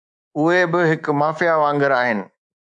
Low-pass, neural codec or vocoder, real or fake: 10.8 kHz; codec, 24 kHz, 3.1 kbps, DualCodec; fake